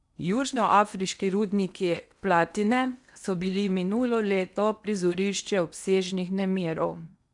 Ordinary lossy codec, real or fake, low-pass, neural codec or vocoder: none; fake; 10.8 kHz; codec, 16 kHz in and 24 kHz out, 0.8 kbps, FocalCodec, streaming, 65536 codes